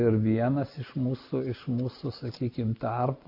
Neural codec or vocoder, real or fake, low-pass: none; real; 5.4 kHz